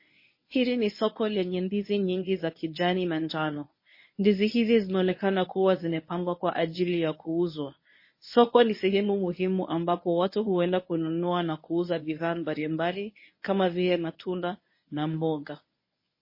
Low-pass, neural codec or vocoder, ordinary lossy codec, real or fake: 5.4 kHz; codec, 24 kHz, 0.9 kbps, WavTokenizer, medium speech release version 1; MP3, 24 kbps; fake